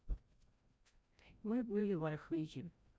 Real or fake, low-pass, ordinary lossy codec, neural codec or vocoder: fake; none; none; codec, 16 kHz, 0.5 kbps, FreqCodec, larger model